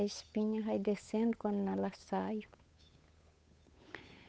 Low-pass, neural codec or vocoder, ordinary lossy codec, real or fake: none; codec, 16 kHz, 8 kbps, FunCodec, trained on Chinese and English, 25 frames a second; none; fake